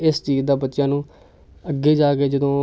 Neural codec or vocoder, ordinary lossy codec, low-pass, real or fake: none; none; none; real